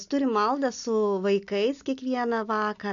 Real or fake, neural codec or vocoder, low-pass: real; none; 7.2 kHz